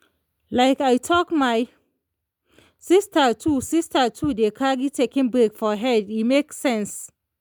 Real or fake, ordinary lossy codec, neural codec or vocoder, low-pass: real; none; none; none